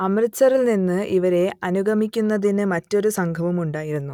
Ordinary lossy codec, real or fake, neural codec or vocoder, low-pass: none; real; none; 19.8 kHz